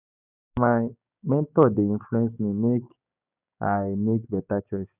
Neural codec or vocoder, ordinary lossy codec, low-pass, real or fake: codec, 16 kHz, 6 kbps, DAC; none; 3.6 kHz; fake